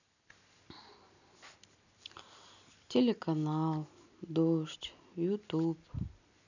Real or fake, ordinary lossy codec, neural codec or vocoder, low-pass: real; none; none; 7.2 kHz